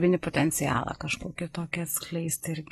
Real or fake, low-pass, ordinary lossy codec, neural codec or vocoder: real; 19.8 kHz; AAC, 32 kbps; none